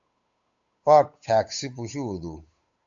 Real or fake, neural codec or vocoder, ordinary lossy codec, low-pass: fake; codec, 16 kHz, 8 kbps, FunCodec, trained on Chinese and English, 25 frames a second; AAC, 64 kbps; 7.2 kHz